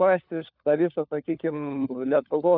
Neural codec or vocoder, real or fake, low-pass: codec, 16 kHz, 4 kbps, FunCodec, trained on LibriTTS, 50 frames a second; fake; 5.4 kHz